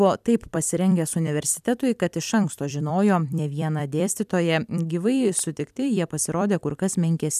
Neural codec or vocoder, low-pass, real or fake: vocoder, 44.1 kHz, 128 mel bands every 256 samples, BigVGAN v2; 14.4 kHz; fake